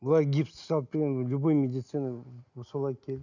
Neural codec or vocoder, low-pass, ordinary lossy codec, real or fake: none; 7.2 kHz; none; real